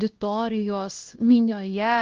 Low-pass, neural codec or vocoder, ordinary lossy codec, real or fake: 7.2 kHz; codec, 16 kHz, 1 kbps, X-Codec, HuBERT features, trained on LibriSpeech; Opus, 16 kbps; fake